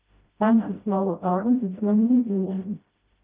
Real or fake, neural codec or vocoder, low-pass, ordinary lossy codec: fake; codec, 16 kHz, 0.5 kbps, FreqCodec, smaller model; 3.6 kHz; Opus, 24 kbps